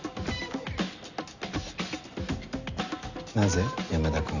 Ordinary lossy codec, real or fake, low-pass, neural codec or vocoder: none; real; 7.2 kHz; none